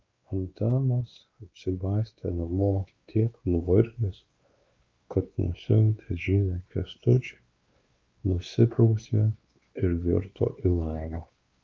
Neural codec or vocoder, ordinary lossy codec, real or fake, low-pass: codec, 16 kHz, 2 kbps, X-Codec, WavLM features, trained on Multilingual LibriSpeech; Opus, 32 kbps; fake; 7.2 kHz